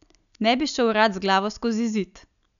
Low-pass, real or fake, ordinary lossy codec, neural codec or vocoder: 7.2 kHz; real; none; none